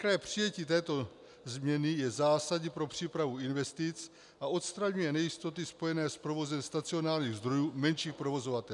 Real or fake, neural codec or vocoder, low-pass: real; none; 10.8 kHz